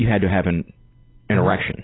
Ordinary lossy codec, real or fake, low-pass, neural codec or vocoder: AAC, 16 kbps; real; 7.2 kHz; none